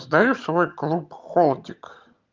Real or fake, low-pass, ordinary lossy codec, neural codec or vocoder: fake; 7.2 kHz; Opus, 32 kbps; vocoder, 22.05 kHz, 80 mel bands, HiFi-GAN